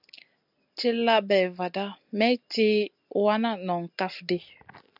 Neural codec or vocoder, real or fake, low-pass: none; real; 5.4 kHz